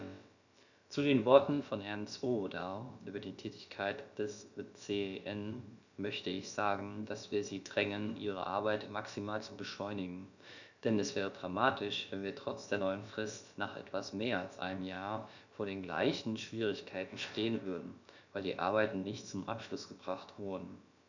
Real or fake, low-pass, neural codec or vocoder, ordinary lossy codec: fake; 7.2 kHz; codec, 16 kHz, about 1 kbps, DyCAST, with the encoder's durations; none